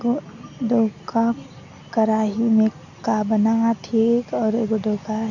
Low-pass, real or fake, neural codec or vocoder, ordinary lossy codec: 7.2 kHz; real; none; none